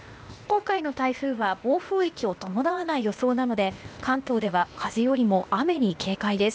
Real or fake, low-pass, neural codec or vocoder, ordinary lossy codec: fake; none; codec, 16 kHz, 0.8 kbps, ZipCodec; none